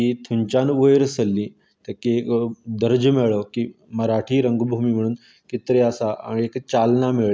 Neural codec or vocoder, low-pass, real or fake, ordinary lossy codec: none; none; real; none